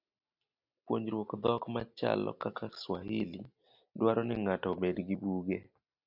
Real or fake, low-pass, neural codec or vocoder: real; 5.4 kHz; none